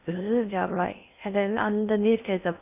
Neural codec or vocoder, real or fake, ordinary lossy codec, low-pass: codec, 16 kHz in and 24 kHz out, 0.6 kbps, FocalCodec, streaming, 4096 codes; fake; none; 3.6 kHz